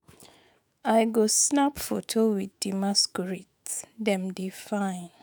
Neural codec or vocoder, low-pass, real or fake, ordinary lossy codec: autoencoder, 48 kHz, 128 numbers a frame, DAC-VAE, trained on Japanese speech; none; fake; none